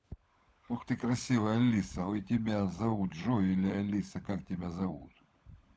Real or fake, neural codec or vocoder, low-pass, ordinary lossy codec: fake; codec, 16 kHz, 16 kbps, FunCodec, trained on LibriTTS, 50 frames a second; none; none